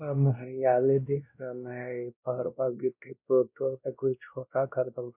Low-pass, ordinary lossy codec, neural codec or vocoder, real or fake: 3.6 kHz; none; codec, 16 kHz, 1 kbps, X-Codec, WavLM features, trained on Multilingual LibriSpeech; fake